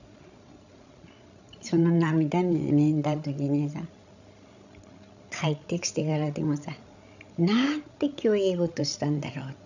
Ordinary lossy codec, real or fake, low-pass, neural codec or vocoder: none; fake; 7.2 kHz; codec, 16 kHz, 16 kbps, FreqCodec, larger model